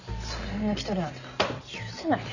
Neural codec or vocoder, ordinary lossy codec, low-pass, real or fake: none; none; 7.2 kHz; real